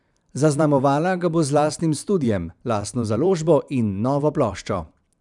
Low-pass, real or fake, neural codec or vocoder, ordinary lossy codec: 10.8 kHz; fake; vocoder, 44.1 kHz, 128 mel bands every 512 samples, BigVGAN v2; none